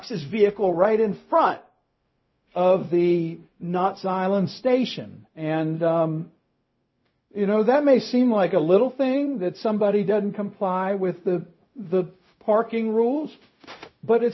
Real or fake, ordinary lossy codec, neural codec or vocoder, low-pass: fake; MP3, 24 kbps; codec, 16 kHz, 0.4 kbps, LongCat-Audio-Codec; 7.2 kHz